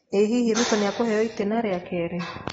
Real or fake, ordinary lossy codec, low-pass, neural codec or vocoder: real; AAC, 24 kbps; 19.8 kHz; none